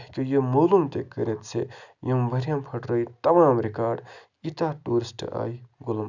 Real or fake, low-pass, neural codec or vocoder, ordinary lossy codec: real; 7.2 kHz; none; none